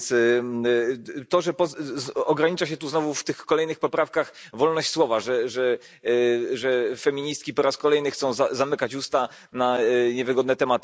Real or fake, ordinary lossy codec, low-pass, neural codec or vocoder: real; none; none; none